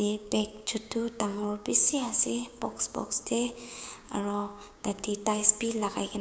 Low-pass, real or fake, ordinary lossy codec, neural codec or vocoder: none; fake; none; codec, 16 kHz, 6 kbps, DAC